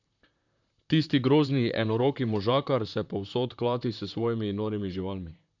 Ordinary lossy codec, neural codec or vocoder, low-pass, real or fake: none; vocoder, 44.1 kHz, 128 mel bands, Pupu-Vocoder; 7.2 kHz; fake